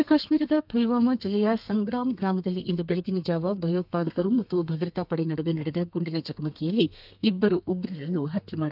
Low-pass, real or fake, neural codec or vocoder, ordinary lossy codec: 5.4 kHz; fake; codec, 32 kHz, 1.9 kbps, SNAC; none